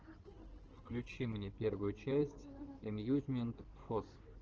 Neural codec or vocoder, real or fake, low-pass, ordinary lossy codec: codec, 16 kHz in and 24 kHz out, 2.2 kbps, FireRedTTS-2 codec; fake; 7.2 kHz; Opus, 16 kbps